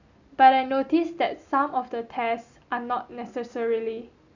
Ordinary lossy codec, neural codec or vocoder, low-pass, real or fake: none; none; 7.2 kHz; real